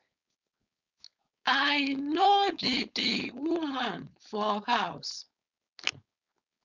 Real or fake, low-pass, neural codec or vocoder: fake; 7.2 kHz; codec, 16 kHz, 4.8 kbps, FACodec